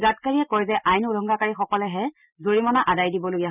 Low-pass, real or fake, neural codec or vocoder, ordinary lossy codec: 3.6 kHz; real; none; none